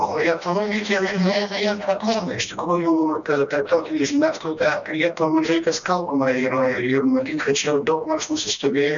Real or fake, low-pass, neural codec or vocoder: fake; 7.2 kHz; codec, 16 kHz, 1 kbps, FreqCodec, smaller model